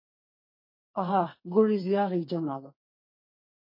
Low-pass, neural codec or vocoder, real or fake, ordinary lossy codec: 5.4 kHz; codec, 32 kHz, 1.9 kbps, SNAC; fake; MP3, 24 kbps